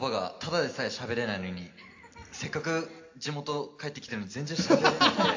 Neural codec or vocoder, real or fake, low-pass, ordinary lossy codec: none; real; 7.2 kHz; AAC, 48 kbps